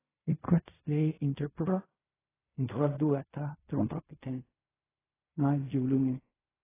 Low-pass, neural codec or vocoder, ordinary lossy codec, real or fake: 3.6 kHz; codec, 16 kHz in and 24 kHz out, 0.4 kbps, LongCat-Audio-Codec, fine tuned four codebook decoder; AAC, 16 kbps; fake